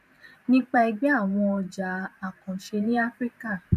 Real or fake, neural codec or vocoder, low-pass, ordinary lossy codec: fake; vocoder, 44.1 kHz, 128 mel bands every 512 samples, BigVGAN v2; 14.4 kHz; none